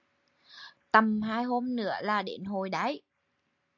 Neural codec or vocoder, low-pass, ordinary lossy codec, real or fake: none; 7.2 kHz; AAC, 48 kbps; real